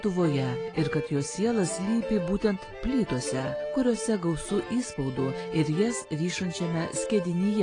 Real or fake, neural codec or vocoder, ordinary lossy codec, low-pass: real; none; AAC, 32 kbps; 9.9 kHz